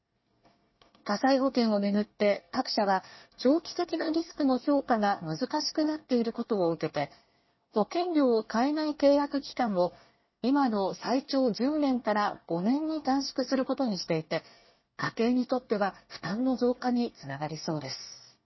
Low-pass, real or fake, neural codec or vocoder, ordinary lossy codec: 7.2 kHz; fake; codec, 24 kHz, 1 kbps, SNAC; MP3, 24 kbps